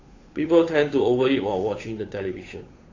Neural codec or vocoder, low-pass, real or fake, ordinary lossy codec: codec, 16 kHz, 8 kbps, FunCodec, trained on Chinese and English, 25 frames a second; 7.2 kHz; fake; AAC, 32 kbps